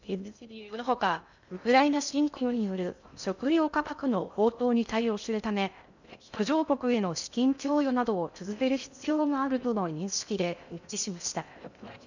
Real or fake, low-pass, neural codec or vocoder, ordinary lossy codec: fake; 7.2 kHz; codec, 16 kHz in and 24 kHz out, 0.6 kbps, FocalCodec, streaming, 2048 codes; none